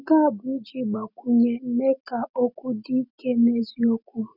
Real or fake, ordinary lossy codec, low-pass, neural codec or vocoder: real; none; 5.4 kHz; none